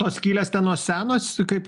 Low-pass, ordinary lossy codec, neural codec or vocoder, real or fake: 10.8 kHz; MP3, 96 kbps; none; real